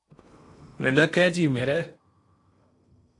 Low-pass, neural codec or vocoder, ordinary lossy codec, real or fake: 10.8 kHz; codec, 16 kHz in and 24 kHz out, 0.8 kbps, FocalCodec, streaming, 65536 codes; AAC, 48 kbps; fake